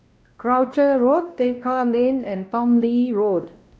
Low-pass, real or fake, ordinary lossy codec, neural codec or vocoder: none; fake; none; codec, 16 kHz, 1 kbps, X-Codec, WavLM features, trained on Multilingual LibriSpeech